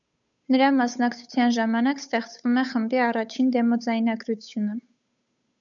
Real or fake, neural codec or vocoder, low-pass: fake; codec, 16 kHz, 8 kbps, FunCodec, trained on Chinese and English, 25 frames a second; 7.2 kHz